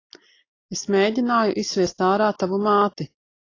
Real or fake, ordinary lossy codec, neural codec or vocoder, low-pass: real; AAC, 32 kbps; none; 7.2 kHz